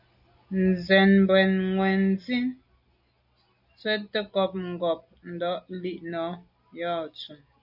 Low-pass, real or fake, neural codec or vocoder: 5.4 kHz; real; none